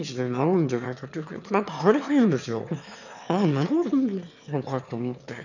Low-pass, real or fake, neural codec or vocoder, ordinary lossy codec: 7.2 kHz; fake; autoencoder, 22.05 kHz, a latent of 192 numbers a frame, VITS, trained on one speaker; none